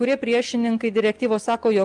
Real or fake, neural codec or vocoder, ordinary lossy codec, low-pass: real; none; Opus, 32 kbps; 10.8 kHz